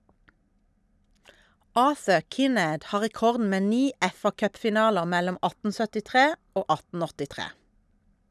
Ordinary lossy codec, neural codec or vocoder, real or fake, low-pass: none; none; real; none